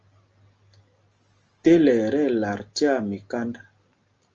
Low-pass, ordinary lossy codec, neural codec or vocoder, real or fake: 7.2 kHz; Opus, 24 kbps; none; real